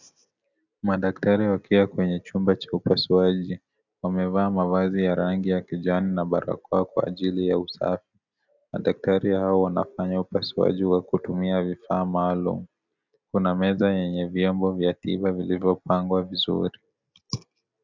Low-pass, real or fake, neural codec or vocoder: 7.2 kHz; real; none